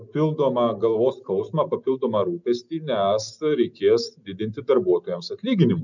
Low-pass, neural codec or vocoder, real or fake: 7.2 kHz; none; real